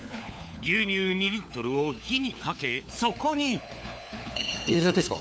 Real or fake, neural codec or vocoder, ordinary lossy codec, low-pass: fake; codec, 16 kHz, 4 kbps, FunCodec, trained on LibriTTS, 50 frames a second; none; none